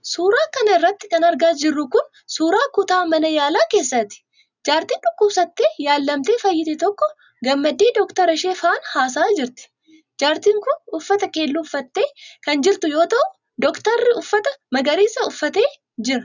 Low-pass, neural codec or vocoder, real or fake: 7.2 kHz; none; real